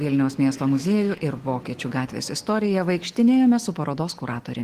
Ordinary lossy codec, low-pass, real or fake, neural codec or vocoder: Opus, 16 kbps; 14.4 kHz; fake; autoencoder, 48 kHz, 128 numbers a frame, DAC-VAE, trained on Japanese speech